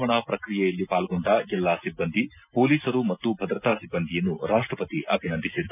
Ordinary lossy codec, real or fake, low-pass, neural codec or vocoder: none; real; 3.6 kHz; none